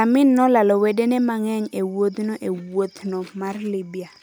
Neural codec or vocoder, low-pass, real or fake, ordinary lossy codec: none; none; real; none